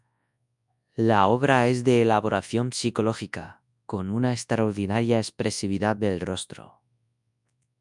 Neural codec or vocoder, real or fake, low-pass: codec, 24 kHz, 0.9 kbps, WavTokenizer, large speech release; fake; 10.8 kHz